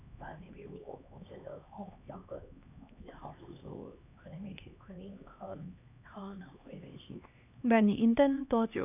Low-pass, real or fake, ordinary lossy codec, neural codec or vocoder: 3.6 kHz; fake; none; codec, 16 kHz, 2 kbps, X-Codec, HuBERT features, trained on LibriSpeech